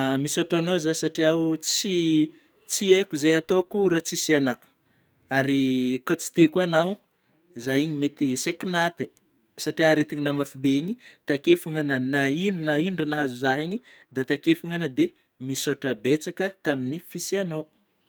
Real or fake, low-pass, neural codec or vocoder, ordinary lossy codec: fake; none; codec, 44.1 kHz, 2.6 kbps, SNAC; none